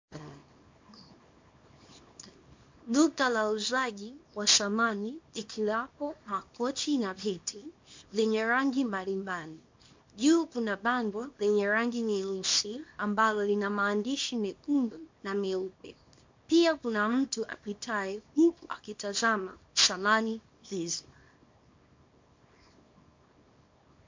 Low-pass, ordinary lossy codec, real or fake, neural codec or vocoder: 7.2 kHz; MP3, 48 kbps; fake; codec, 24 kHz, 0.9 kbps, WavTokenizer, small release